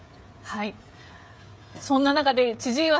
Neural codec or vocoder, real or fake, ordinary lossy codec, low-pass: codec, 16 kHz, 16 kbps, FreqCodec, smaller model; fake; none; none